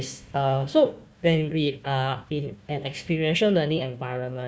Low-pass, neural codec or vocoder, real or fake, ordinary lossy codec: none; codec, 16 kHz, 1 kbps, FunCodec, trained on Chinese and English, 50 frames a second; fake; none